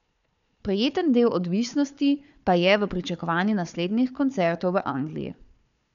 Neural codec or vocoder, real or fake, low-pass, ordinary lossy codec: codec, 16 kHz, 4 kbps, FunCodec, trained on Chinese and English, 50 frames a second; fake; 7.2 kHz; none